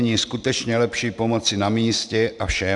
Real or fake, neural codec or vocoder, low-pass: fake; vocoder, 44.1 kHz, 128 mel bands every 512 samples, BigVGAN v2; 10.8 kHz